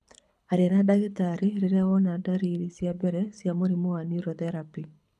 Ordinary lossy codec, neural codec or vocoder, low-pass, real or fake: none; codec, 24 kHz, 6 kbps, HILCodec; none; fake